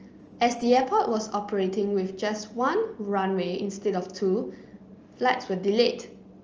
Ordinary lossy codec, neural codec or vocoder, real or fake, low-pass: Opus, 24 kbps; none; real; 7.2 kHz